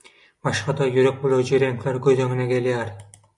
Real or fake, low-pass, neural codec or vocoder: real; 10.8 kHz; none